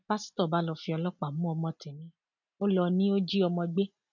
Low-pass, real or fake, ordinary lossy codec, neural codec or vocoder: 7.2 kHz; real; none; none